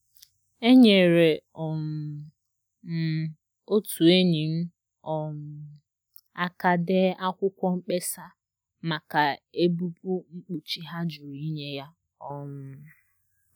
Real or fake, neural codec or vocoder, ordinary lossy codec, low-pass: real; none; none; 19.8 kHz